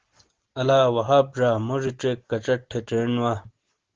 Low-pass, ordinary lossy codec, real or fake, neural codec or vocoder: 7.2 kHz; Opus, 16 kbps; real; none